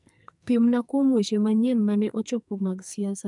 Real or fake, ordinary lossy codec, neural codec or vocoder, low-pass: fake; none; codec, 44.1 kHz, 2.6 kbps, SNAC; 10.8 kHz